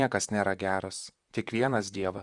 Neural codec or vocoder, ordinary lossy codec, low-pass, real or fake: vocoder, 44.1 kHz, 128 mel bands, Pupu-Vocoder; Opus, 64 kbps; 10.8 kHz; fake